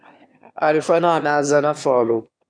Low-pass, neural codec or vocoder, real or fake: 9.9 kHz; autoencoder, 22.05 kHz, a latent of 192 numbers a frame, VITS, trained on one speaker; fake